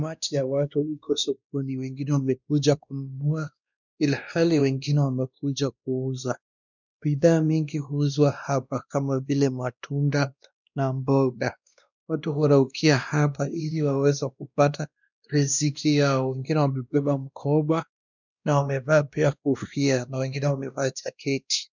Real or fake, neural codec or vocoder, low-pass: fake; codec, 16 kHz, 1 kbps, X-Codec, WavLM features, trained on Multilingual LibriSpeech; 7.2 kHz